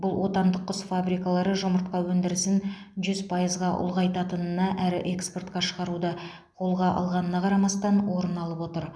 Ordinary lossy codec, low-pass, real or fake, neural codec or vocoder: none; none; real; none